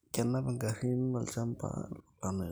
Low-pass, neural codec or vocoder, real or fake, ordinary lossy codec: none; none; real; none